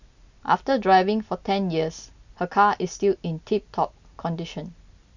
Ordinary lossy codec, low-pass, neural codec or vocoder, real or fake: none; 7.2 kHz; none; real